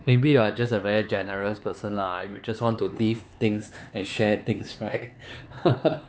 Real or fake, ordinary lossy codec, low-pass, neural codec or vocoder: fake; none; none; codec, 16 kHz, 4 kbps, X-Codec, HuBERT features, trained on LibriSpeech